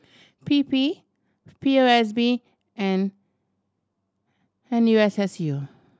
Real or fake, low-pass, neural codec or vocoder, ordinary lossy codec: real; none; none; none